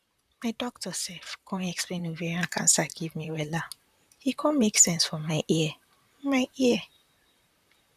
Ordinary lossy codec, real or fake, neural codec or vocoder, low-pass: none; fake; vocoder, 44.1 kHz, 128 mel bands, Pupu-Vocoder; 14.4 kHz